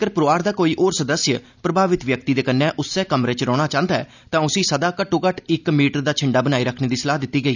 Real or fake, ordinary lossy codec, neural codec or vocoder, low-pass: real; none; none; 7.2 kHz